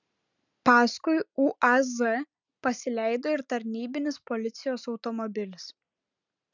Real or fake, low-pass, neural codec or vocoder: real; 7.2 kHz; none